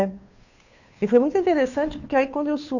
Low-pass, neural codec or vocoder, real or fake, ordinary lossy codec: 7.2 kHz; codec, 16 kHz, 2 kbps, FunCodec, trained on Chinese and English, 25 frames a second; fake; none